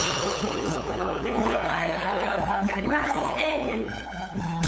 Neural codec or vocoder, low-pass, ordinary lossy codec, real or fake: codec, 16 kHz, 8 kbps, FunCodec, trained on LibriTTS, 25 frames a second; none; none; fake